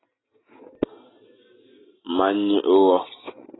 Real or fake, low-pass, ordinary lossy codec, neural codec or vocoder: real; 7.2 kHz; AAC, 16 kbps; none